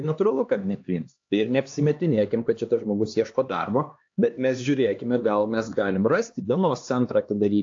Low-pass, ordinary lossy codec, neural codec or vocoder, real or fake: 7.2 kHz; AAC, 48 kbps; codec, 16 kHz, 2 kbps, X-Codec, HuBERT features, trained on LibriSpeech; fake